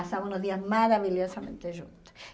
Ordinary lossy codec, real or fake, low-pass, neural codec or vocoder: none; real; none; none